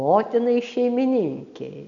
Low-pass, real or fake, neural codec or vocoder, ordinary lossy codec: 7.2 kHz; real; none; AAC, 64 kbps